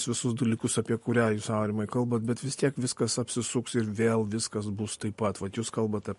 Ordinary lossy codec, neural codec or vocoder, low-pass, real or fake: MP3, 48 kbps; none; 14.4 kHz; real